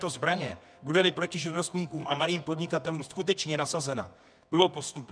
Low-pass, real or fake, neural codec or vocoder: 9.9 kHz; fake; codec, 24 kHz, 0.9 kbps, WavTokenizer, medium music audio release